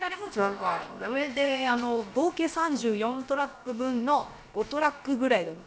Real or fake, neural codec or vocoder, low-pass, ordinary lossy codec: fake; codec, 16 kHz, about 1 kbps, DyCAST, with the encoder's durations; none; none